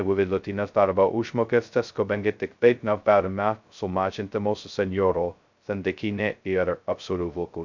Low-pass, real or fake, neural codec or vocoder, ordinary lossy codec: 7.2 kHz; fake; codec, 16 kHz, 0.2 kbps, FocalCodec; MP3, 64 kbps